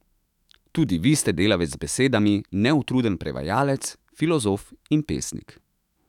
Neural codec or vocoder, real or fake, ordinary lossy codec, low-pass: autoencoder, 48 kHz, 128 numbers a frame, DAC-VAE, trained on Japanese speech; fake; none; 19.8 kHz